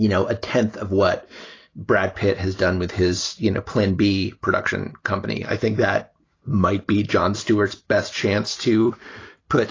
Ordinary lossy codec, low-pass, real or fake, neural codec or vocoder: AAC, 32 kbps; 7.2 kHz; real; none